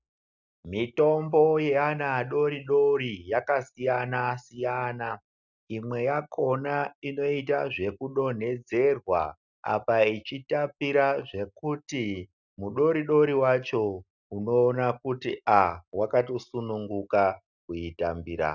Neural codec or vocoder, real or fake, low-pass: none; real; 7.2 kHz